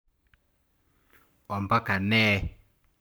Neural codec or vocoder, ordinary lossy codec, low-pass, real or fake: codec, 44.1 kHz, 7.8 kbps, Pupu-Codec; none; none; fake